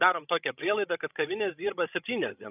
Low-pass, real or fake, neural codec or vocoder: 3.6 kHz; fake; codec, 16 kHz, 16 kbps, FreqCodec, larger model